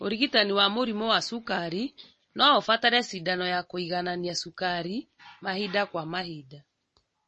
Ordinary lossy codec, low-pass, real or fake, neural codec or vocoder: MP3, 32 kbps; 10.8 kHz; real; none